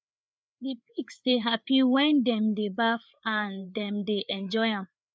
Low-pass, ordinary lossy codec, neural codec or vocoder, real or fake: none; none; codec, 16 kHz, 4 kbps, FreqCodec, larger model; fake